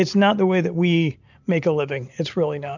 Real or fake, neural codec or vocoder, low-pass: real; none; 7.2 kHz